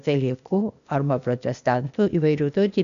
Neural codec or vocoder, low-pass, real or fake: codec, 16 kHz, 0.8 kbps, ZipCodec; 7.2 kHz; fake